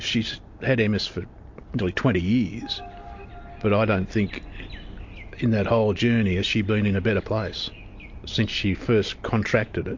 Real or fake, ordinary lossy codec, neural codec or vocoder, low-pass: real; MP3, 48 kbps; none; 7.2 kHz